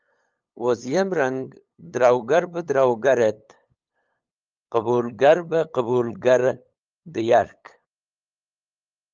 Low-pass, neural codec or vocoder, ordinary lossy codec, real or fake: 7.2 kHz; codec, 16 kHz, 8 kbps, FunCodec, trained on LibriTTS, 25 frames a second; Opus, 24 kbps; fake